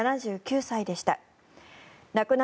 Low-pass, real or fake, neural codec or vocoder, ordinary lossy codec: none; real; none; none